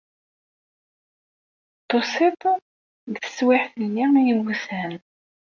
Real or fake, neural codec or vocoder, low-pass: real; none; 7.2 kHz